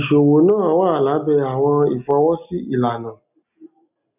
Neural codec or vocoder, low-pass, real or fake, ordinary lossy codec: none; 3.6 kHz; real; none